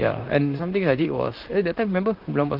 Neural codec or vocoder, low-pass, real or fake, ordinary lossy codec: vocoder, 44.1 kHz, 128 mel bands, Pupu-Vocoder; 5.4 kHz; fake; Opus, 32 kbps